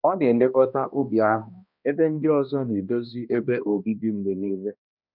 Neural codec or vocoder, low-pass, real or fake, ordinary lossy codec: codec, 16 kHz, 1 kbps, X-Codec, HuBERT features, trained on balanced general audio; 5.4 kHz; fake; none